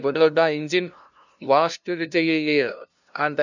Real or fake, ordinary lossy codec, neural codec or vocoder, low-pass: fake; none; codec, 16 kHz, 0.5 kbps, FunCodec, trained on LibriTTS, 25 frames a second; 7.2 kHz